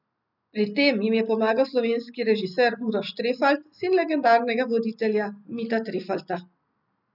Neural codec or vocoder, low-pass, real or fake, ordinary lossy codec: none; 5.4 kHz; real; none